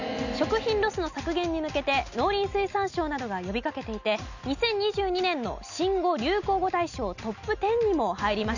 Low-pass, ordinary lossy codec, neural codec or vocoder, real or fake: 7.2 kHz; none; none; real